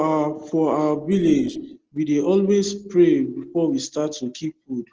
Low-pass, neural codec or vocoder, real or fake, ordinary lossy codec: 7.2 kHz; none; real; Opus, 16 kbps